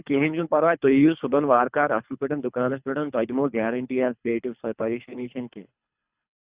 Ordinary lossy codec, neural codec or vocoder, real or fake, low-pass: Opus, 64 kbps; codec, 24 kHz, 3 kbps, HILCodec; fake; 3.6 kHz